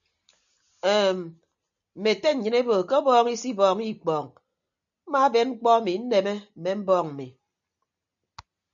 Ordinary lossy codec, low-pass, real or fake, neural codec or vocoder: MP3, 96 kbps; 7.2 kHz; real; none